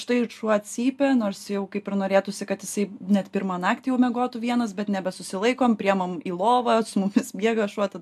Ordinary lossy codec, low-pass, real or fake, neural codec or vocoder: AAC, 96 kbps; 14.4 kHz; real; none